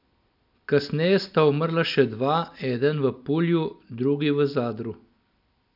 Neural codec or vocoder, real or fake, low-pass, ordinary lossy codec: none; real; 5.4 kHz; none